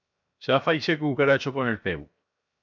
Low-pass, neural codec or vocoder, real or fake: 7.2 kHz; codec, 16 kHz, 0.7 kbps, FocalCodec; fake